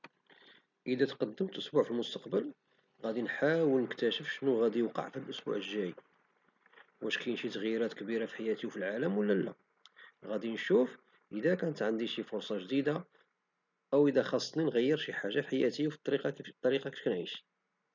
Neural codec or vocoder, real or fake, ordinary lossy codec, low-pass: none; real; none; 7.2 kHz